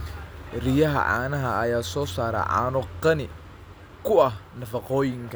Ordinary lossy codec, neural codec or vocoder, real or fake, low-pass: none; none; real; none